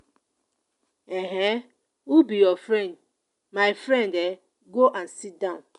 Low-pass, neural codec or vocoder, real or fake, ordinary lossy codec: 10.8 kHz; none; real; none